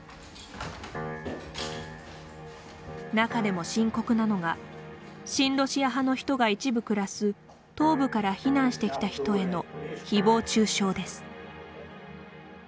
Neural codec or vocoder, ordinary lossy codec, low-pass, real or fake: none; none; none; real